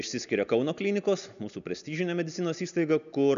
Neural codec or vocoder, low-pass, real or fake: none; 7.2 kHz; real